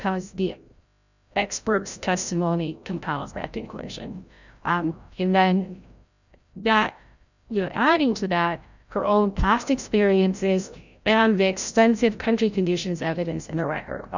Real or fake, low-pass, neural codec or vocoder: fake; 7.2 kHz; codec, 16 kHz, 0.5 kbps, FreqCodec, larger model